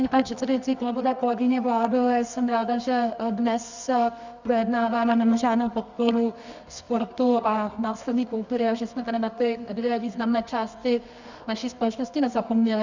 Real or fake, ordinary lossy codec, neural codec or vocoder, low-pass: fake; Opus, 64 kbps; codec, 24 kHz, 0.9 kbps, WavTokenizer, medium music audio release; 7.2 kHz